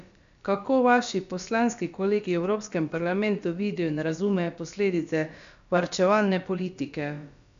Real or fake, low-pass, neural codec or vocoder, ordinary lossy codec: fake; 7.2 kHz; codec, 16 kHz, about 1 kbps, DyCAST, with the encoder's durations; MP3, 64 kbps